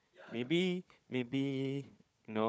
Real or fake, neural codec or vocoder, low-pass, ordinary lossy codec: fake; codec, 16 kHz, 4 kbps, FunCodec, trained on Chinese and English, 50 frames a second; none; none